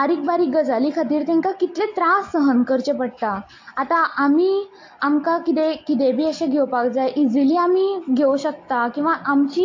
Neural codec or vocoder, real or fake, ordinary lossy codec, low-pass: none; real; AAC, 48 kbps; 7.2 kHz